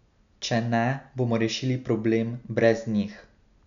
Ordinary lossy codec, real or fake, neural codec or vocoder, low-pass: none; real; none; 7.2 kHz